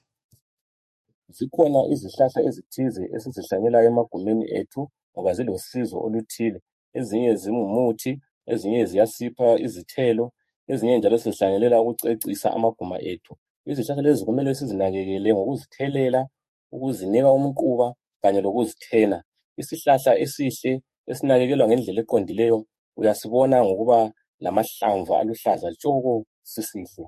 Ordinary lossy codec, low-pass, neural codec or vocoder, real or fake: MP3, 64 kbps; 14.4 kHz; codec, 44.1 kHz, 7.8 kbps, DAC; fake